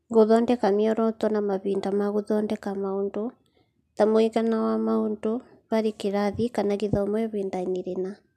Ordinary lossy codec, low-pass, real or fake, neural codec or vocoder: none; 14.4 kHz; real; none